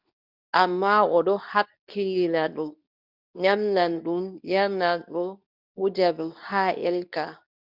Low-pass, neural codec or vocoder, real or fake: 5.4 kHz; codec, 24 kHz, 0.9 kbps, WavTokenizer, medium speech release version 2; fake